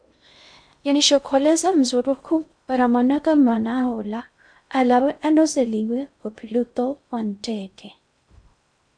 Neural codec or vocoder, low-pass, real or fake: codec, 16 kHz in and 24 kHz out, 0.6 kbps, FocalCodec, streaming, 2048 codes; 9.9 kHz; fake